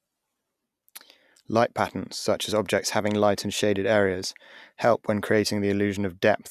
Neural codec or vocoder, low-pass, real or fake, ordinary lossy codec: none; 14.4 kHz; real; none